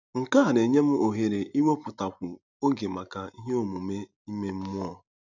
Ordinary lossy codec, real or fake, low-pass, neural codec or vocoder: none; real; 7.2 kHz; none